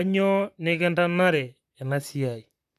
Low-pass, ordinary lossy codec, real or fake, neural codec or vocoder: 14.4 kHz; none; fake; vocoder, 44.1 kHz, 128 mel bands, Pupu-Vocoder